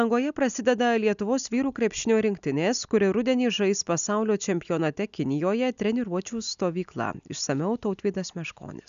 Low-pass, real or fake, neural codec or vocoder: 7.2 kHz; real; none